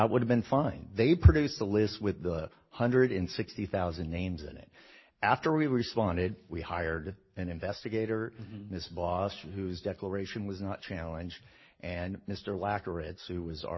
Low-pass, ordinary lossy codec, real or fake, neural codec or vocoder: 7.2 kHz; MP3, 24 kbps; real; none